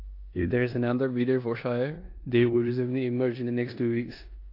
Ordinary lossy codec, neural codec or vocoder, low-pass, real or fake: AAC, 32 kbps; codec, 16 kHz in and 24 kHz out, 0.9 kbps, LongCat-Audio-Codec, four codebook decoder; 5.4 kHz; fake